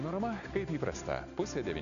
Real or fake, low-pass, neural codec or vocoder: real; 7.2 kHz; none